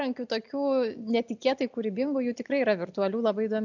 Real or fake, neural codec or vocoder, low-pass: real; none; 7.2 kHz